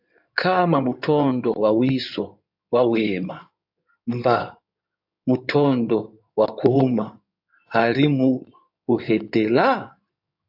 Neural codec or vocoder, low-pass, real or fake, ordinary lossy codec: codec, 16 kHz in and 24 kHz out, 2.2 kbps, FireRedTTS-2 codec; 5.4 kHz; fake; AAC, 48 kbps